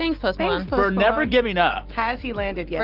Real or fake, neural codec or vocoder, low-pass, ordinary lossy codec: real; none; 5.4 kHz; Opus, 32 kbps